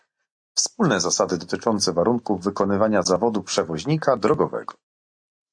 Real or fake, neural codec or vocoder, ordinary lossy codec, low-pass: real; none; AAC, 64 kbps; 9.9 kHz